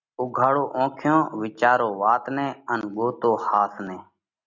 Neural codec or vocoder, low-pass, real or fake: none; 7.2 kHz; real